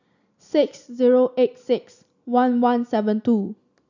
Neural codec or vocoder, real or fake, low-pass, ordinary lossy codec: none; real; 7.2 kHz; none